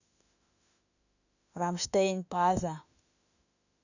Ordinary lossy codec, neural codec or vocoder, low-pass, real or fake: none; autoencoder, 48 kHz, 32 numbers a frame, DAC-VAE, trained on Japanese speech; 7.2 kHz; fake